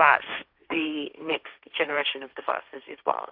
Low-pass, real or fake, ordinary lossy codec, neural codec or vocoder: 5.4 kHz; fake; AAC, 48 kbps; codec, 16 kHz, 1.1 kbps, Voila-Tokenizer